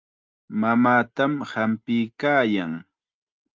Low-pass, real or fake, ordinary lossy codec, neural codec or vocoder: 7.2 kHz; real; Opus, 32 kbps; none